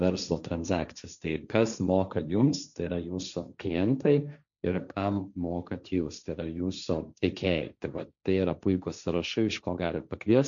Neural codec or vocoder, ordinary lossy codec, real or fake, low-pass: codec, 16 kHz, 1.1 kbps, Voila-Tokenizer; MP3, 96 kbps; fake; 7.2 kHz